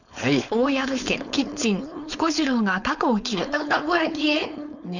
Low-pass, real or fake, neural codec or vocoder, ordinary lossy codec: 7.2 kHz; fake; codec, 16 kHz, 4.8 kbps, FACodec; none